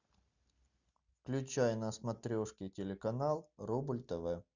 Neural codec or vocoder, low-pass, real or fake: none; 7.2 kHz; real